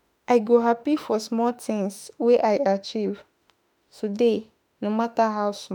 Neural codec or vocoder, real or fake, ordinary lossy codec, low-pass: autoencoder, 48 kHz, 32 numbers a frame, DAC-VAE, trained on Japanese speech; fake; none; none